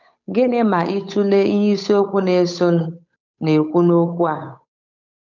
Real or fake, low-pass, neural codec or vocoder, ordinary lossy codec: fake; 7.2 kHz; codec, 16 kHz, 8 kbps, FunCodec, trained on Chinese and English, 25 frames a second; none